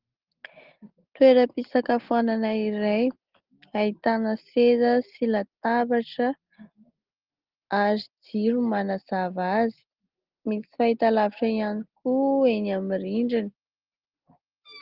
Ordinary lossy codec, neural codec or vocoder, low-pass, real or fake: Opus, 16 kbps; none; 5.4 kHz; real